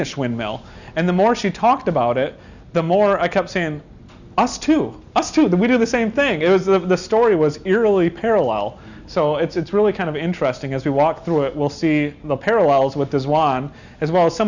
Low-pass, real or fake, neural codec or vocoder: 7.2 kHz; real; none